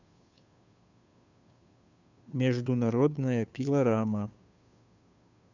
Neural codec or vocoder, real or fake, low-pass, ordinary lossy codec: codec, 16 kHz, 2 kbps, FunCodec, trained on Chinese and English, 25 frames a second; fake; 7.2 kHz; none